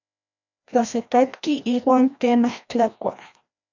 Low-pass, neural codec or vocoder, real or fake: 7.2 kHz; codec, 16 kHz, 1 kbps, FreqCodec, larger model; fake